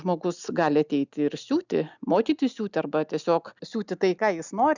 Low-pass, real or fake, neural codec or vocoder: 7.2 kHz; real; none